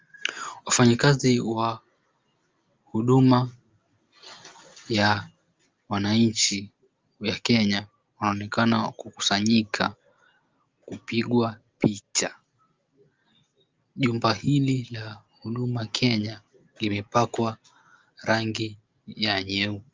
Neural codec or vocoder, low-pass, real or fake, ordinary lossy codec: none; 7.2 kHz; real; Opus, 32 kbps